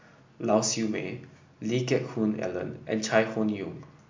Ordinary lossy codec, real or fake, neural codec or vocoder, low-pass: MP3, 64 kbps; real; none; 7.2 kHz